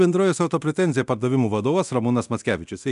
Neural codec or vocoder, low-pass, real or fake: codec, 24 kHz, 0.9 kbps, DualCodec; 10.8 kHz; fake